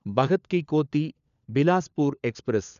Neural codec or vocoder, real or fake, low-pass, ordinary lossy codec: codec, 16 kHz, 4 kbps, FunCodec, trained on LibriTTS, 50 frames a second; fake; 7.2 kHz; none